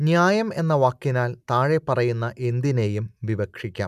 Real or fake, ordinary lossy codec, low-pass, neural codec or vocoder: real; none; 14.4 kHz; none